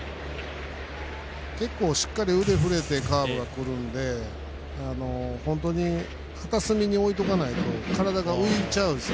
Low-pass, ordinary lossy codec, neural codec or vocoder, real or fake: none; none; none; real